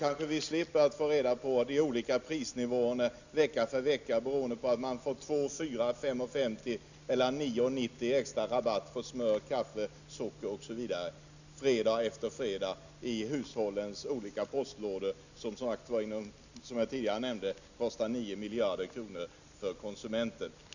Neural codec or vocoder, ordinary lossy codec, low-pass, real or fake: none; none; 7.2 kHz; real